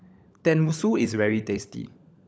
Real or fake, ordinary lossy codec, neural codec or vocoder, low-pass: fake; none; codec, 16 kHz, 16 kbps, FunCodec, trained on LibriTTS, 50 frames a second; none